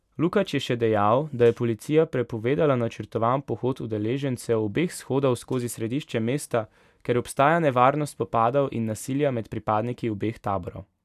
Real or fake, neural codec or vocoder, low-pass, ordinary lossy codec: real; none; 14.4 kHz; none